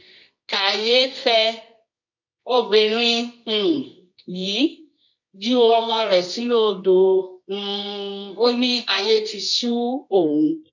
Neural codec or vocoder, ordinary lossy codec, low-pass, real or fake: codec, 24 kHz, 0.9 kbps, WavTokenizer, medium music audio release; none; 7.2 kHz; fake